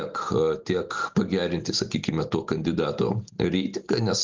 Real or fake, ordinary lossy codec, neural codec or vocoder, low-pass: real; Opus, 32 kbps; none; 7.2 kHz